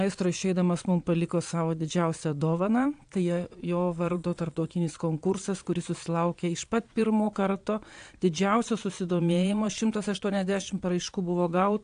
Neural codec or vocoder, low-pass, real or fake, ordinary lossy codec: vocoder, 22.05 kHz, 80 mel bands, Vocos; 9.9 kHz; fake; AAC, 64 kbps